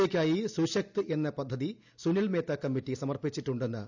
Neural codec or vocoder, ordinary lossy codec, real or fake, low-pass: none; none; real; 7.2 kHz